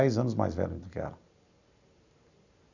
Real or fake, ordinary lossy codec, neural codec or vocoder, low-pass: real; none; none; 7.2 kHz